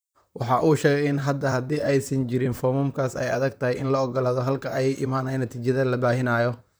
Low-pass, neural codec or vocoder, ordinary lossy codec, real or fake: none; vocoder, 44.1 kHz, 128 mel bands, Pupu-Vocoder; none; fake